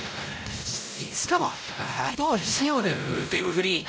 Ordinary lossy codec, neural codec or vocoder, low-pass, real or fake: none; codec, 16 kHz, 0.5 kbps, X-Codec, WavLM features, trained on Multilingual LibriSpeech; none; fake